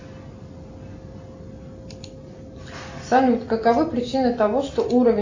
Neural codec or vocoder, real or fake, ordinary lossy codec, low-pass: none; real; AAC, 48 kbps; 7.2 kHz